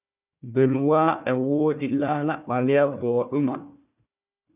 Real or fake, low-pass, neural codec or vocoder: fake; 3.6 kHz; codec, 16 kHz, 1 kbps, FunCodec, trained on Chinese and English, 50 frames a second